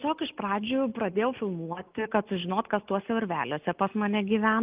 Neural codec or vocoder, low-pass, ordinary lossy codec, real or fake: none; 3.6 kHz; Opus, 24 kbps; real